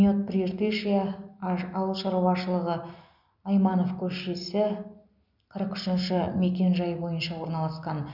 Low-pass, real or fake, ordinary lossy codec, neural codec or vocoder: 5.4 kHz; real; none; none